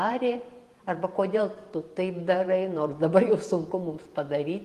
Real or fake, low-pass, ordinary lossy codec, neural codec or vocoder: fake; 14.4 kHz; Opus, 24 kbps; vocoder, 48 kHz, 128 mel bands, Vocos